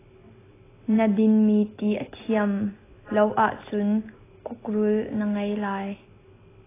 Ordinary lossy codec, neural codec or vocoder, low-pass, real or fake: AAC, 16 kbps; none; 3.6 kHz; real